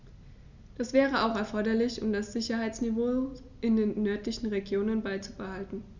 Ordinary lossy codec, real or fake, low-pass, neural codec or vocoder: Opus, 64 kbps; real; 7.2 kHz; none